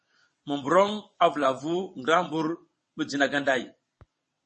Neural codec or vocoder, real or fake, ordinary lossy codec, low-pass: vocoder, 22.05 kHz, 80 mel bands, WaveNeXt; fake; MP3, 32 kbps; 9.9 kHz